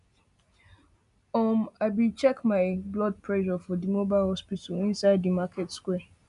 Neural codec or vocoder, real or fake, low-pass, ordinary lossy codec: none; real; 10.8 kHz; none